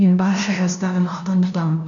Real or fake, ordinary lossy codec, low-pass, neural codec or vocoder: fake; none; 7.2 kHz; codec, 16 kHz, 0.5 kbps, FunCodec, trained on LibriTTS, 25 frames a second